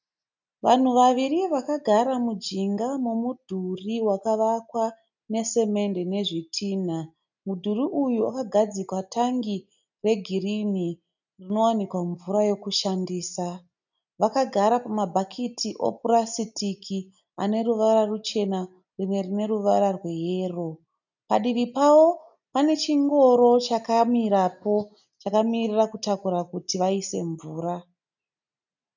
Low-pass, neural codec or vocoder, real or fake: 7.2 kHz; none; real